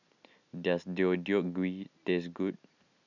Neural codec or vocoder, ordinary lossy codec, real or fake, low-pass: none; none; real; 7.2 kHz